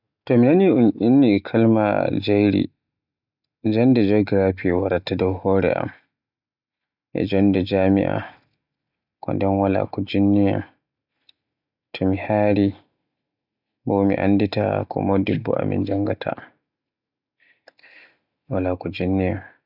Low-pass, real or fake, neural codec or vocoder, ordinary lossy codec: 5.4 kHz; real; none; none